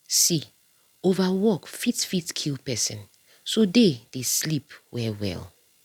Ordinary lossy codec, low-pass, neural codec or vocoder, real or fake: none; 19.8 kHz; none; real